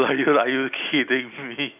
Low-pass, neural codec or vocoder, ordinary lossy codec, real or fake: 3.6 kHz; none; none; real